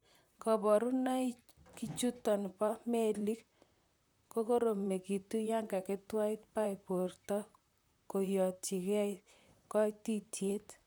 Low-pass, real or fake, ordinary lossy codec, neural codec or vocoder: none; fake; none; vocoder, 44.1 kHz, 128 mel bands, Pupu-Vocoder